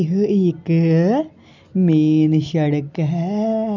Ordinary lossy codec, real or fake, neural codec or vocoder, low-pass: none; real; none; 7.2 kHz